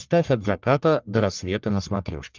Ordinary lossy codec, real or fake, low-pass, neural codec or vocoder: Opus, 32 kbps; fake; 7.2 kHz; codec, 44.1 kHz, 1.7 kbps, Pupu-Codec